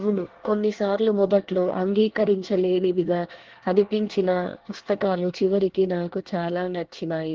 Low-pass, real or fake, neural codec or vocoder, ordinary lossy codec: 7.2 kHz; fake; codec, 24 kHz, 1 kbps, SNAC; Opus, 16 kbps